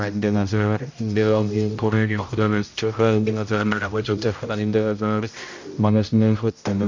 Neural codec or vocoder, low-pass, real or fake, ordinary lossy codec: codec, 16 kHz, 0.5 kbps, X-Codec, HuBERT features, trained on general audio; 7.2 kHz; fake; MP3, 48 kbps